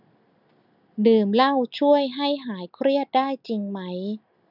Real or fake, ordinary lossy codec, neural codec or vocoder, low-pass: real; none; none; 5.4 kHz